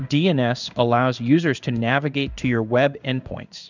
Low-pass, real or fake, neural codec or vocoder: 7.2 kHz; fake; codec, 16 kHz in and 24 kHz out, 1 kbps, XY-Tokenizer